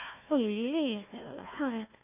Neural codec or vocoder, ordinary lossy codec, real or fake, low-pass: codec, 16 kHz, 1 kbps, FunCodec, trained on Chinese and English, 50 frames a second; none; fake; 3.6 kHz